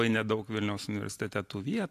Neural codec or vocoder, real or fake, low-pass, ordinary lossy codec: none; real; 14.4 kHz; AAC, 64 kbps